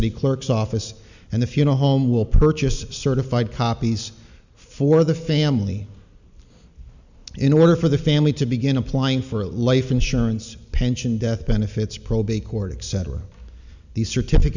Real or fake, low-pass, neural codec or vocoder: real; 7.2 kHz; none